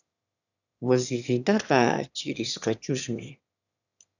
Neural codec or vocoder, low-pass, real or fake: autoencoder, 22.05 kHz, a latent of 192 numbers a frame, VITS, trained on one speaker; 7.2 kHz; fake